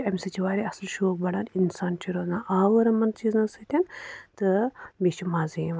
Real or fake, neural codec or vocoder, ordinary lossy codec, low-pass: real; none; none; none